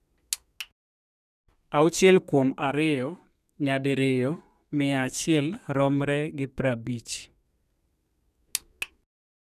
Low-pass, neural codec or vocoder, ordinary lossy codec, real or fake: 14.4 kHz; codec, 32 kHz, 1.9 kbps, SNAC; none; fake